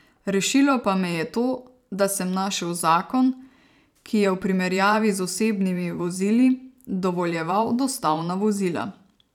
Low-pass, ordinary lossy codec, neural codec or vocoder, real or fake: 19.8 kHz; none; vocoder, 44.1 kHz, 128 mel bands every 512 samples, BigVGAN v2; fake